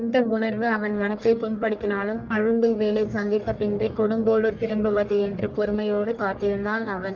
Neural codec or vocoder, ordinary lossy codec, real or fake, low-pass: codec, 44.1 kHz, 1.7 kbps, Pupu-Codec; Opus, 32 kbps; fake; 7.2 kHz